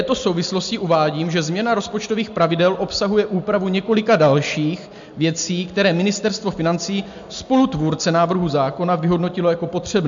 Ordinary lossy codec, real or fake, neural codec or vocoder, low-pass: MP3, 64 kbps; real; none; 7.2 kHz